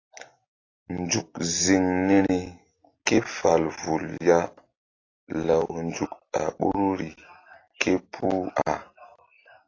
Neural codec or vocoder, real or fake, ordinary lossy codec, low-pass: none; real; AAC, 32 kbps; 7.2 kHz